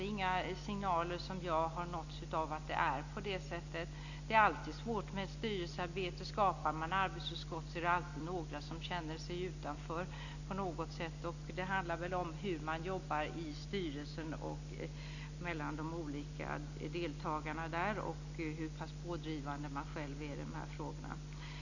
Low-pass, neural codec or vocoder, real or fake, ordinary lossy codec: 7.2 kHz; none; real; none